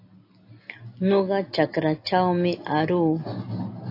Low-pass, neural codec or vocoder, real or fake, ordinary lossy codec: 5.4 kHz; none; real; AAC, 32 kbps